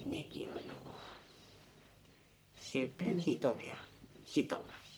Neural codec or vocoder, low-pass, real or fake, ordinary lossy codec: codec, 44.1 kHz, 1.7 kbps, Pupu-Codec; none; fake; none